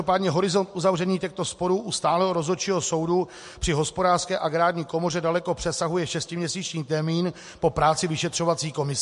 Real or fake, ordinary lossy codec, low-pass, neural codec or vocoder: real; MP3, 48 kbps; 14.4 kHz; none